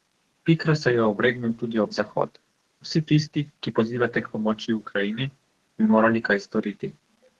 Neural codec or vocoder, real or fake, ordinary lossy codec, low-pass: codec, 32 kHz, 1.9 kbps, SNAC; fake; Opus, 16 kbps; 14.4 kHz